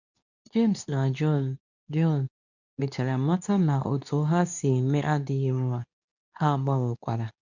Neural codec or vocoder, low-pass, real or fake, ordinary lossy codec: codec, 24 kHz, 0.9 kbps, WavTokenizer, medium speech release version 2; 7.2 kHz; fake; none